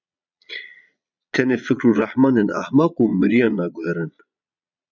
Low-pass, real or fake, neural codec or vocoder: 7.2 kHz; fake; vocoder, 22.05 kHz, 80 mel bands, Vocos